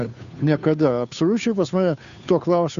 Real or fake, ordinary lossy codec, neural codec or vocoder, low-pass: fake; AAC, 96 kbps; codec, 16 kHz, 2 kbps, FunCodec, trained on Chinese and English, 25 frames a second; 7.2 kHz